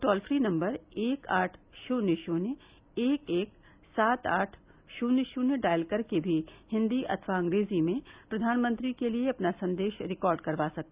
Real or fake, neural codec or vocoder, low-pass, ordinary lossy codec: real; none; 3.6 kHz; Opus, 64 kbps